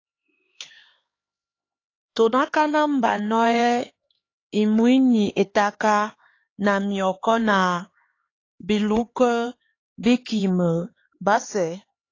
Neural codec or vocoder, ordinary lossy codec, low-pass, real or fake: codec, 16 kHz, 4 kbps, X-Codec, HuBERT features, trained on LibriSpeech; AAC, 32 kbps; 7.2 kHz; fake